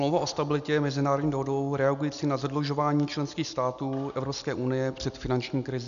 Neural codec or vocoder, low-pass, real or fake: none; 7.2 kHz; real